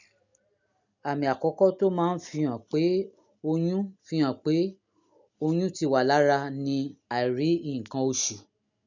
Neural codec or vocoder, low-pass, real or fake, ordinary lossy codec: none; 7.2 kHz; real; none